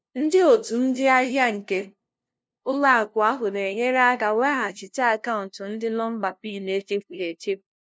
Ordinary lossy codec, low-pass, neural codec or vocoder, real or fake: none; none; codec, 16 kHz, 0.5 kbps, FunCodec, trained on LibriTTS, 25 frames a second; fake